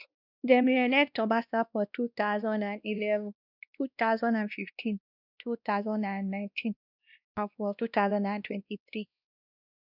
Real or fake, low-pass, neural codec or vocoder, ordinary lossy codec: fake; 5.4 kHz; codec, 16 kHz, 1 kbps, X-Codec, WavLM features, trained on Multilingual LibriSpeech; none